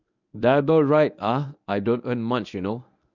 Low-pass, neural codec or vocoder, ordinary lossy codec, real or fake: 7.2 kHz; codec, 24 kHz, 0.9 kbps, WavTokenizer, medium speech release version 1; none; fake